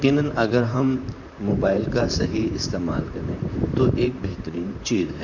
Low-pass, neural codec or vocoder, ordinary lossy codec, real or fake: 7.2 kHz; vocoder, 44.1 kHz, 128 mel bands, Pupu-Vocoder; none; fake